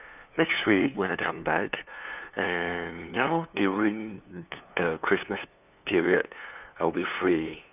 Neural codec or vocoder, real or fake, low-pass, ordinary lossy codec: codec, 16 kHz, 2 kbps, FunCodec, trained on LibriTTS, 25 frames a second; fake; 3.6 kHz; none